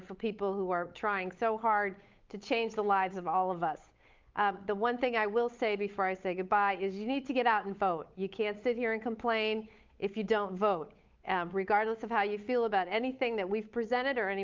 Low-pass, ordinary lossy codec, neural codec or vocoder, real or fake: 7.2 kHz; Opus, 24 kbps; codec, 16 kHz, 8 kbps, FunCodec, trained on Chinese and English, 25 frames a second; fake